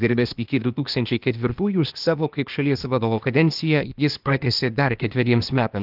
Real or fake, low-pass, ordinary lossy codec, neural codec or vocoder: fake; 5.4 kHz; Opus, 32 kbps; codec, 16 kHz, 0.8 kbps, ZipCodec